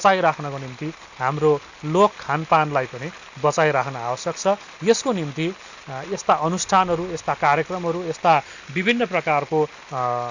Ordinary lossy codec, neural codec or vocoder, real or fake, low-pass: Opus, 64 kbps; none; real; 7.2 kHz